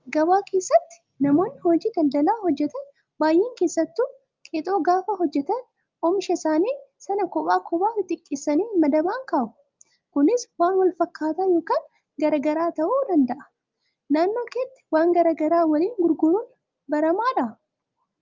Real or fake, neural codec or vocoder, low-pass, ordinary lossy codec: real; none; 7.2 kHz; Opus, 24 kbps